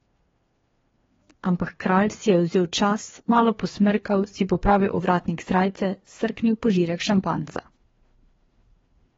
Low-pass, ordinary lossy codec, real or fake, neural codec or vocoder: 7.2 kHz; AAC, 24 kbps; fake; codec, 16 kHz, 2 kbps, FreqCodec, larger model